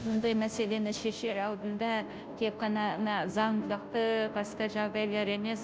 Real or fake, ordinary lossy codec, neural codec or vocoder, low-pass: fake; none; codec, 16 kHz, 0.5 kbps, FunCodec, trained on Chinese and English, 25 frames a second; none